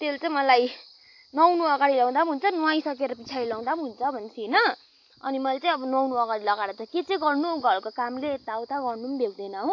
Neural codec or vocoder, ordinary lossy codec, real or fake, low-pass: none; AAC, 48 kbps; real; 7.2 kHz